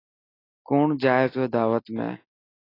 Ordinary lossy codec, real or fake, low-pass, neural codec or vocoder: AAC, 24 kbps; real; 5.4 kHz; none